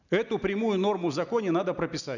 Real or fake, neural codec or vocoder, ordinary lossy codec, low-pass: real; none; none; 7.2 kHz